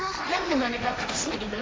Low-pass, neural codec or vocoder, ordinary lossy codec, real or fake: none; codec, 16 kHz, 1.1 kbps, Voila-Tokenizer; none; fake